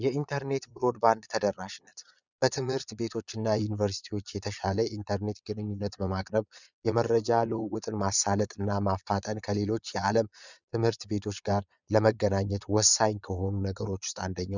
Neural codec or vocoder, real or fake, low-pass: vocoder, 22.05 kHz, 80 mel bands, Vocos; fake; 7.2 kHz